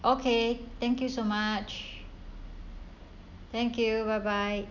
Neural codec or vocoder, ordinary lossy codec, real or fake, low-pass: none; none; real; 7.2 kHz